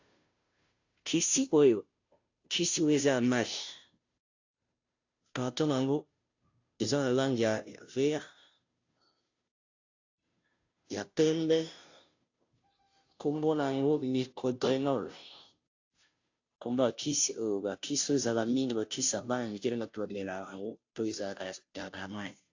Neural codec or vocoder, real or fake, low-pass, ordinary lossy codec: codec, 16 kHz, 0.5 kbps, FunCodec, trained on Chinese and English, 25 frames a second; fake; 7.2 kHz; AAC, 48 kbps